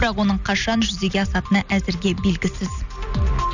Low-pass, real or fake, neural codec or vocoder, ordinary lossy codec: 7.2 kHz; real; none; none